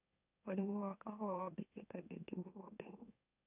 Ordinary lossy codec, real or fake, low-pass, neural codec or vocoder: none; fake; 3.6 kHz; autoencoder, 44.1 kHz, a latent of 192 numbers a frame, MeloTTS